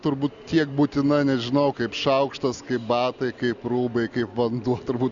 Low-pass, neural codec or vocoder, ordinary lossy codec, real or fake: 7.2 kHz; none; Opus, 64 kbps; real